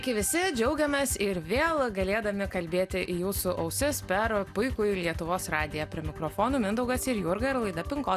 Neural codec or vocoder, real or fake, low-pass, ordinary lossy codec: none; real; 14.4 kHz; Opus, 64 kbps